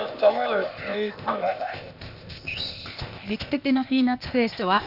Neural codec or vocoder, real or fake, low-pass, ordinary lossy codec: codec, 16 kHz, 0.8 kbps, ZipCodec; fake; 5.4 kHz; none